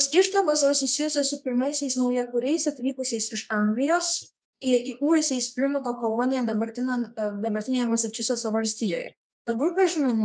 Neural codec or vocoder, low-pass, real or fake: codec, 24 kHz, 0.9 kbps, WavTokenizer, medium music audio release; 9.9 kHz; fake